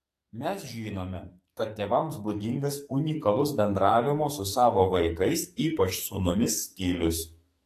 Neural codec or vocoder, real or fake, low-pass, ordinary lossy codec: codec, 44.1 kHz, 2.6 kbps, SNAC; fake; 14.4 kHz; AAC, 64 kbps